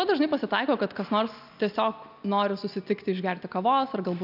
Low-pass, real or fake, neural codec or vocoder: 5.4 kHz; real; none